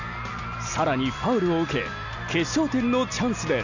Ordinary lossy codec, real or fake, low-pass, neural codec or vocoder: none; real; 7.2 kHz; none